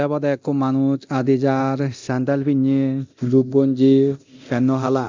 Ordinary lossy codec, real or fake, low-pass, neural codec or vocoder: MP3, 64 kbps; fake; 7.2 kHz; codec, 24 kHz, 0.9 kbps, DualCodec